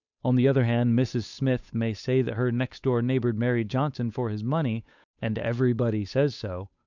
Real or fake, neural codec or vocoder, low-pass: fake; codec, 16 kHz, 8 kbps, FunCodec, trained on Chinese and English, 25 frames a second; 7.2 kHz